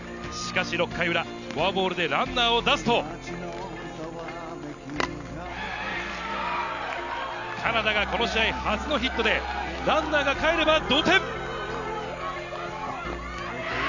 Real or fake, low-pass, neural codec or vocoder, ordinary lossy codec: real; 7.2 kHz; none; none